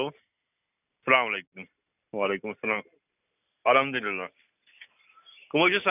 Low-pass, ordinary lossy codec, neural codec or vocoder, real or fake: 3.6 kHz; none; none; real